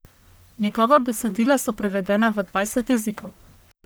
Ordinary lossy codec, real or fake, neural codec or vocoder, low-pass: none; fake; codec, 44.1 kHz, 1.7 kbps, Pupu-Codec; none